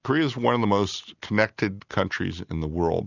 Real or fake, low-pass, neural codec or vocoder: real; 7.2 kHz; none